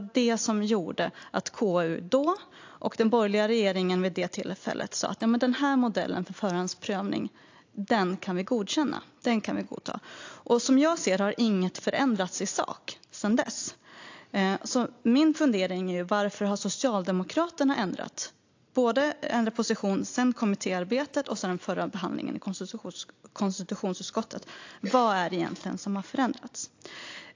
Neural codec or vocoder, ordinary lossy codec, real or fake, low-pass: none; AAC, 48 kbps; real; 7.2 kHz